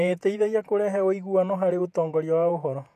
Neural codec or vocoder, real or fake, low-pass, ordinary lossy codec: vocoder, 48 kHz, 128 mel bands, Vocos; fake; 14.4 kHz; none